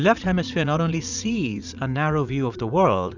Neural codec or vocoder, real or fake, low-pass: vocoder, 44.1 kHz, 80 mel bands, Vocos; fake; 7.2 kHz